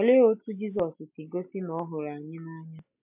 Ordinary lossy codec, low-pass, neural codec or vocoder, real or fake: MP3, 32 kbps; 3.6 kHz; none; real